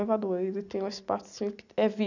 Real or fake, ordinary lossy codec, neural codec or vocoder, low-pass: real; none; none; 7.2 kHz